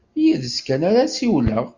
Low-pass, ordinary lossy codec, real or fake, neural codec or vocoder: 7.2 kHz; Opus, 64 kbps; real; none